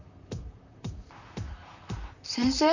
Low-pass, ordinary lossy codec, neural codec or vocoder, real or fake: 7.2 kHz; none; vocoder, 44.1 kHz, 128 mel bands, Pupu-Vocoder; fake